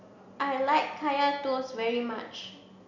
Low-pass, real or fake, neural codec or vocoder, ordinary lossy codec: 7.2 kHz; real; none; none